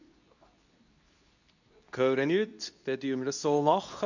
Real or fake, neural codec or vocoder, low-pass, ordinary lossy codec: fake; codec, 24 kHz, 0.9 kbps, WavTokenizer, medium speech release version 2; 7.2 kHz; none